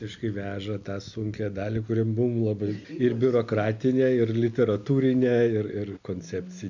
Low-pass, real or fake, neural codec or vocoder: 7.2 kHz; real; none